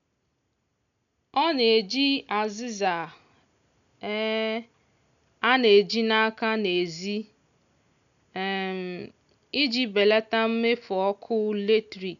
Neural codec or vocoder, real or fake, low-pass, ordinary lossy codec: none; real; 7.2 kHz; none